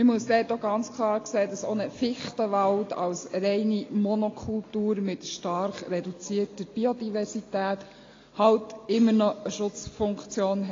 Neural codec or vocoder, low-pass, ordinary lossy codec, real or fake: none; 7.2 kHz; AAC, 32 kbps; real